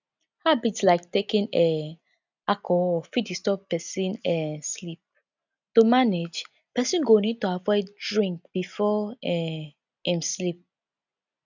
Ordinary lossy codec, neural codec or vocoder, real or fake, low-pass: none; none; real; 7.2 kHz